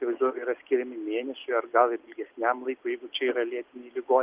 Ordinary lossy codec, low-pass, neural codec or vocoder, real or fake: Opus, 32 kbps; 3.6 kHz; none; real